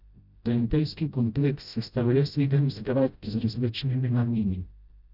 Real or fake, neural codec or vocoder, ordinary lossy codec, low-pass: fake; codec, 16 kHz, 0.5 kbps, FreqCodec, smaller model; none; 5.4 kHz